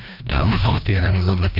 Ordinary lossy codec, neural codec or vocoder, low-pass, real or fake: none; codec, 16 kHz, 1 kbps, FreqCodec, larger model; 5.4 kHz; fake